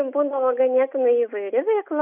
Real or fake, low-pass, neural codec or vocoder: real; 3.6 kHz; none